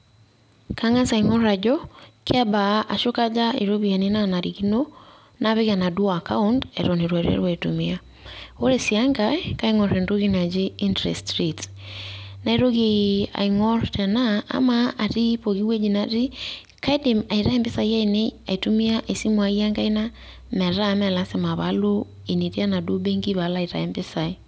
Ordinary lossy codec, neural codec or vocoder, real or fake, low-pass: none; none; real; none